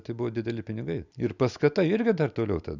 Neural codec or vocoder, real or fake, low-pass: none; real; 7.2 kHz